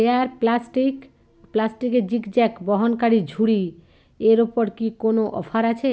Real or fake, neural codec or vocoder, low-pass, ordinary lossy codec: real; none; none; none